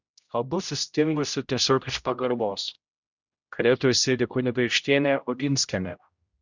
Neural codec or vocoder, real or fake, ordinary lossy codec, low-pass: codec, 16 kHz, 0.5 kbps, X-Codec, HuBERT features, trained on general audio; fake; Opus, 64 kbps; 7.2 kHz